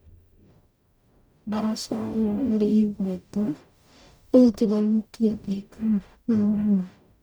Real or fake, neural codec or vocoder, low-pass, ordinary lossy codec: fake; codec, 44.1 kHz, 0.9 kbps, DAC; none; none